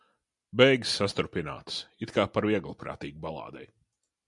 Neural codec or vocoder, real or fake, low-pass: none; real; 10.8 kHz